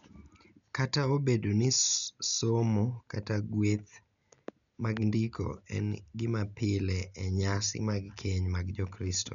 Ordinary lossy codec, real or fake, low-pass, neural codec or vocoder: none; real; 7.2 kHz; none